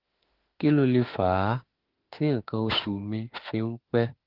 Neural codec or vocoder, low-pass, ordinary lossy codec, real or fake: autoencoder, 48 kHz, 32 numbers a frame, DAC-VAE, trained on Japanese speech; 5.4 kHz; Opus, 16 kbps; fake